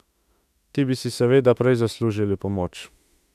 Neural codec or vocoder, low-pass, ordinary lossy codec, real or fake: autoencoder, 48 kHz, 32 numbers a frame, DAC-VAE, trained on Japanese speech; 14.4 kHz; none; fake